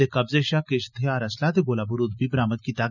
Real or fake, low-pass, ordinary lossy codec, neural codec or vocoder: real; 7.2 kHz; none; none